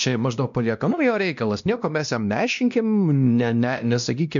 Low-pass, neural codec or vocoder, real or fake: 7.2 kHz; codec, 16 kHz, 1 kbps, X-Codec, WavLM features, trained on Multilingual LibriSpeech; fake